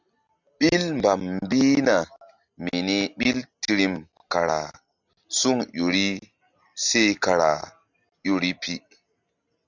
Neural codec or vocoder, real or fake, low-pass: none; real; 7.2 kHz